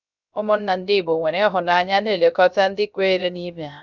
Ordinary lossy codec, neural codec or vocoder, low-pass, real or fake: none; codec, 16 kHz, 0.3 kbps, FocalCodec; 7.2 kHz; fake